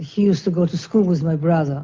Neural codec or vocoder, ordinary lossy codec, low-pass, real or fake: none; Opus, 24 kbps; 7.2 kHz; real